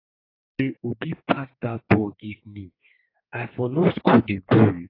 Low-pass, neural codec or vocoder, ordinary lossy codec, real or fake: 5.4 kHz; codec, 32 kHz, 1.9 kbps, SNAC; AAC, 24 kbps; fake